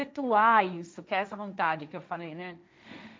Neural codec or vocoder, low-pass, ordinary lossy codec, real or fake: codec, 16 kHz, 1.1 kbps, Voila-Tokenizer; none; none; fake